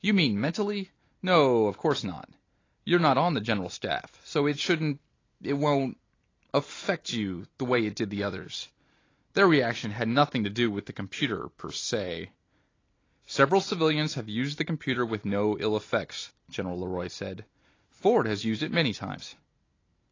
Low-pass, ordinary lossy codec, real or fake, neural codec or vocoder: 7.2 kHz; AAC, 32 kbps; real; none